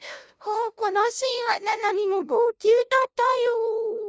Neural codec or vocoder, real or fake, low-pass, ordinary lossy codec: codec, 16 kHz, 0.5 kbps, FunCodec, trained on LibriTTS, 25 frames a second; fake; none; none